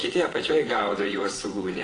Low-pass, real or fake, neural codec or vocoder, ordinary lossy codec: 9.9 kHz; fake; vocoder, 22.05 kHz, 80 mel bands, WaveNeXt; AAC, 32 kbps